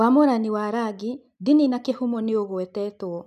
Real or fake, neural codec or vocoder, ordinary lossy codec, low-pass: real; none; none; 14.4 kHz